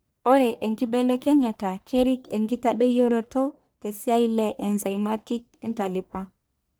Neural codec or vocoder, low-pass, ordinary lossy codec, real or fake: codec, 44.1 kHz, 1.7 kbps, Pupu-Codec; none; none; fake